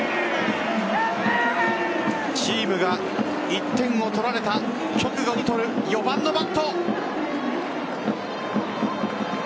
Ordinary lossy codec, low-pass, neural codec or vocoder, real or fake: none; none; none; real